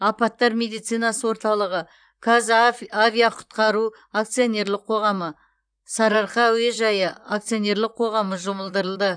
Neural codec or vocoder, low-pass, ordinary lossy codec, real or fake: vocoder, 44.1 kHz, 128 mel bands, Pupu-Vocoder; 9.9 kHz; none; fake